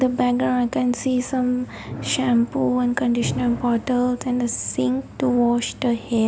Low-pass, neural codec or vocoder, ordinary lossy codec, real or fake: none; none; none; real